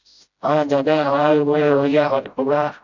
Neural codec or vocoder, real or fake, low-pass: codec, 16 kHz, 0.5 kbps, FreqCodec, smaller model; fake; 7.2 kHz